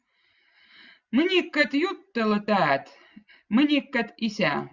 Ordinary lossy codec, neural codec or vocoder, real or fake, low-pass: Opus, 64 kbps; none; real; 7.2 kHz